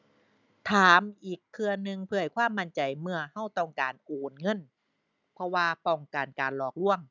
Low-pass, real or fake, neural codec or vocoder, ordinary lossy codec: 7.2 kHz; real; none; none